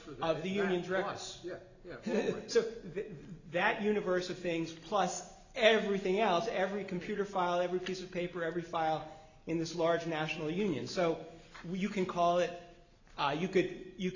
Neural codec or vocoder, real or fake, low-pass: none; real; 7.2 kHz